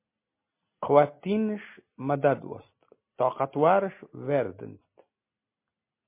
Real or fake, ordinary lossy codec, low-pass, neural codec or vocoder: real; MP3, 24 kbps; 3.6 kHz; none